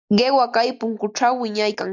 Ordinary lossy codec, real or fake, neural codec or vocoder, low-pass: MP3, 64 kbps; real; none; 7.2 kHz